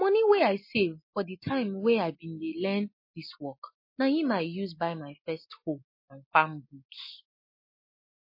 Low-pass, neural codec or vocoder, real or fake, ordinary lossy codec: 5.4 kHz; none; real; MP3, 24 kbps